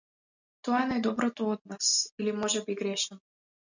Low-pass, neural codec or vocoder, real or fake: 7.2 kHz; none; real